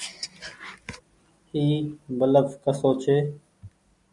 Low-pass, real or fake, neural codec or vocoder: 10.8 kHz; real; none